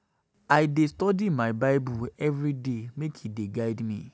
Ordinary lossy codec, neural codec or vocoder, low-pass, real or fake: none; none; none; real